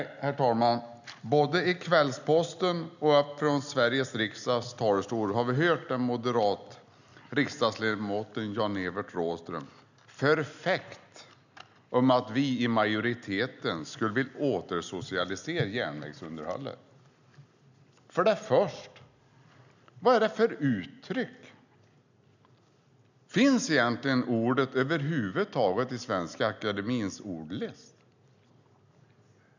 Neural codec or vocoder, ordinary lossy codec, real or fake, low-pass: none; none; real; 7.2 kHz